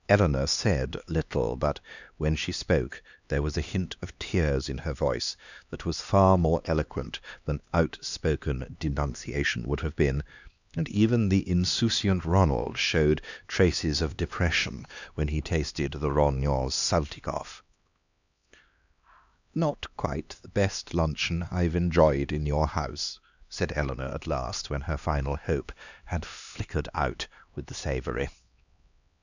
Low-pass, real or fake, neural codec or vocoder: 7.2 kHz; fake; codec, 16 kHz, 2 kbps, X-Codec, HuBERT features, trained on LibriSpeech